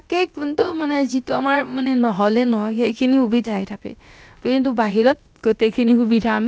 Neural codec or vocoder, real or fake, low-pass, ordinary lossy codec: codec, 16 kHz, about 1 kbps, DyCAST, with the encoder's durations; fake; none; none